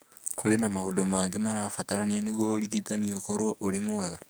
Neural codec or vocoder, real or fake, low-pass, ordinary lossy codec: codec, 44.1 kHz, 2.6 kbps, SNAC; fake; none; none